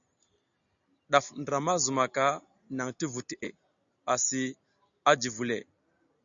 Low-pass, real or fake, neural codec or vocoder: 7.2 kHz; real; none